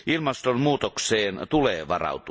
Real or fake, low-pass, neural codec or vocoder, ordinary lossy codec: real; none; none; none